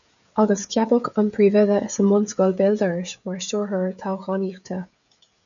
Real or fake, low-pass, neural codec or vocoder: fake; 7.2 kHz; codec, 16 kHz, 16 kbps, FreqCodec, smaller model